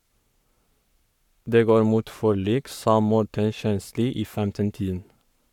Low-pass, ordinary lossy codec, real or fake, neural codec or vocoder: 19.8 kHz; none; fake; codec, 44.1 kHz, 7.8 kbps, Pupu-Codec